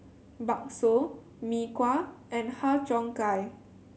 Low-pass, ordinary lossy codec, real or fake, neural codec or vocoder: none; none; real; none